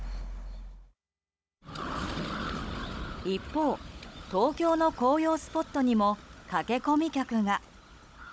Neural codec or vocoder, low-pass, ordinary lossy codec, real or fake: codec, 16 kHz, 16 kbps, FunCodec, trained on Chinese and English, 50 frames a second; none; none; fake